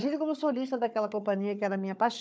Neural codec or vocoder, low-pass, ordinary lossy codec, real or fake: codec, 16 kHz, 4 kbps, FunCodec, trained on Chinese and English, 50 frames a second; none; none; fake